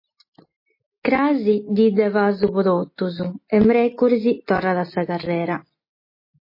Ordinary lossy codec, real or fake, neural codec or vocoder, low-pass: MP3, 24 kbps; real; none; 5.4 kHz